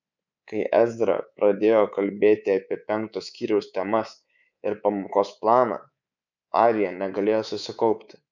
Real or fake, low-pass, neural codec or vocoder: fake; 7.2 kHz; codec, 24 kHz, 3.1 kbps, DualCodec